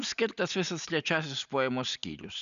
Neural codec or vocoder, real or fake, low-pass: none; real; 7.2 kHz